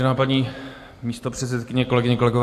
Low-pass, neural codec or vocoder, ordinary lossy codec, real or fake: 14.4 kHz; none; AAC, 64 kbps; real